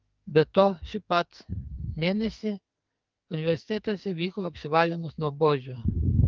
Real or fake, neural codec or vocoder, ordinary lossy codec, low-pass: fake; codec, 44.1 kHz, 2.6 kbps, SNAC; Opus, 24 kbps; 7.2 kHz